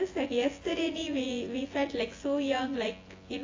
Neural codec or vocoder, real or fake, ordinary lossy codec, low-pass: vocoder, 24 kHz, 100 mel bands, Vocos; fake; AAC, 32 kbps; 7.2 kHz